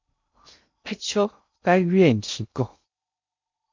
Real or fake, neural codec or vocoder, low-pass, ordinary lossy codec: fake; codec, 16 kHz in and 24 kHz out, 0.6 kbps, FocalCodec, streaming, 2048 codes; 7.2 kHz; MP3, 48 kbps